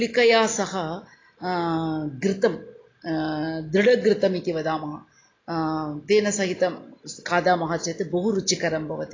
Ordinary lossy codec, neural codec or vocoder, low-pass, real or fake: AAC, 32 kbps; none; 7.2 kHz; real